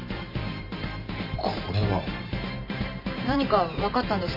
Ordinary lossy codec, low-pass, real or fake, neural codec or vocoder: none; 5.4 kHz; fake; vocoder, 44.1 kHz, 128 mel bands every 512 samples, BigVGAN v2